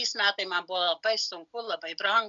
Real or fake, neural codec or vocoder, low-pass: real; none; 7.2 kHz